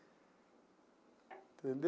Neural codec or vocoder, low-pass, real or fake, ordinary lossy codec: none; none; real; none